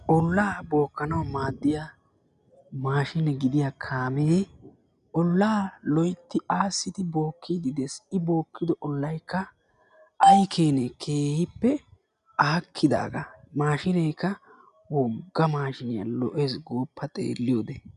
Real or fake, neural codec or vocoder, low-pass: real; none; 10.8 kHz